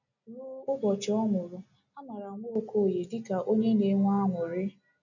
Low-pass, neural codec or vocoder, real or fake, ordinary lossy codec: 7.2 kHz; none; real; none